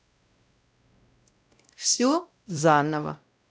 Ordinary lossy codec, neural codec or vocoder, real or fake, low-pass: none; codec, 16 kHz, 0.5 kbps, X-Codec, WavLM features, trained on Multilingual LibriSpeech; fake; none